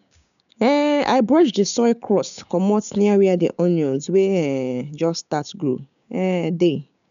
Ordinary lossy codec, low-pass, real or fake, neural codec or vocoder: none; 7.2 kHz; fake; codec, 16 kHz, 6 kbps, DAC